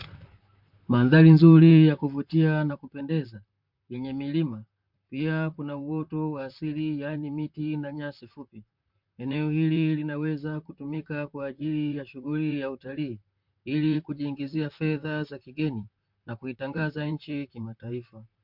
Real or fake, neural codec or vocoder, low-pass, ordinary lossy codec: fake; vocoder, 44.1 kHz, 128 mel bands, Pupu-Vocoder; 5.4 kHz; MP3, 48 kbps